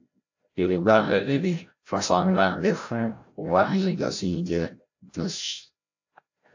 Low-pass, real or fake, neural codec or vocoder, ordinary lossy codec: 7.2 kHz; fake; codec, 16 kHz, 0.5 kbps, FreqCodec, larger model; AAC, 48 kbps